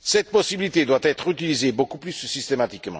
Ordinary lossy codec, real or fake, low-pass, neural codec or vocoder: none; real; none; none